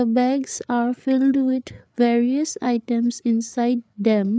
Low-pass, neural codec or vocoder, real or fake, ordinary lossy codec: none; codec, 16 kHz, 8 kbps, FreqCodec, larger model; fake; none